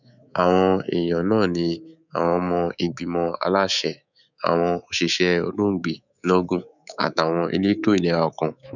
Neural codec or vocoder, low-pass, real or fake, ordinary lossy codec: codec, 24 kHz, 3.1 kbps, DualCodec; 7.2 kHz; fake; none